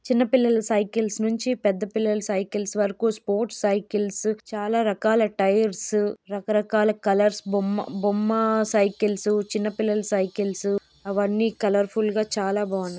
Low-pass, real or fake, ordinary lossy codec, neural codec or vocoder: none; real; none; none